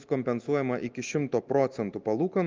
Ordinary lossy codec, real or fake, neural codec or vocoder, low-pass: Opus, 32 kbps; real; none; 7.2 kHz